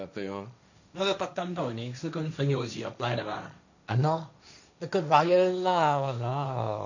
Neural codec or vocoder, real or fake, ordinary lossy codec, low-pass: codec, 16 kHz, 1.1 kbps, Voila-Tokenizer; fake; none; 7.2 kHz